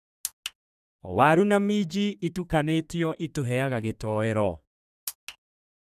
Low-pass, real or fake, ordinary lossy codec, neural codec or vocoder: 14.4 kHz; fake; none; codec, 32 kHz, 1.9 kbps, SNAC